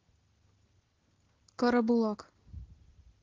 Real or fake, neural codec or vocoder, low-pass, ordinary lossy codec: real; none; 7.2 kHz; Opus, 16 kbps